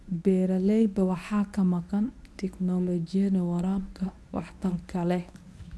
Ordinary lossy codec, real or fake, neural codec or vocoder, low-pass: none; fake; codec, 24 kHz, 0.9 kbps, WavTokenizer, medium speech release version 1; none